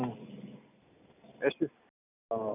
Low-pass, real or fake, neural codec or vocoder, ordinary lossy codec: 3.6 kHz; real; none; none